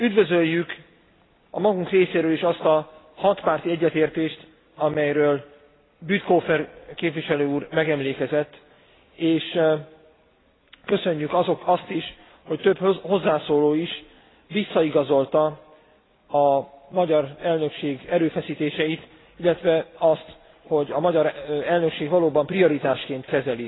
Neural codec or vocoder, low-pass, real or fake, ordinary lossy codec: none; 7.2 kHz; real; AAC, 16 kbps